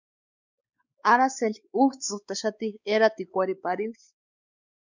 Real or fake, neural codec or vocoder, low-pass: fake; codec, 16 kHz, 4 kbps, X-Codec, WavLM features, trained on Multilingual LibriSpeech; 7.2 kHz